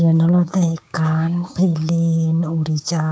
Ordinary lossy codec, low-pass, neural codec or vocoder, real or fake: none; none; codec, 16 kHz, 6 kbps, DAC; fake